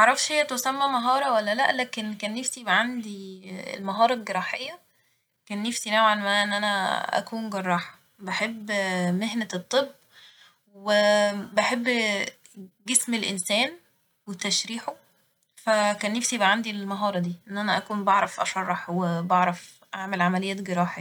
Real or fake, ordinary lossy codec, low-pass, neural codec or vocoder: real; none; none; none